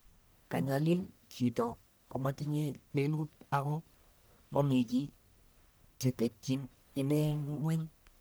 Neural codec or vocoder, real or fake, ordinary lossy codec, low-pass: codec, 44.1 kHz, 1.7 kbps, Pupu-Codec; fake; none; none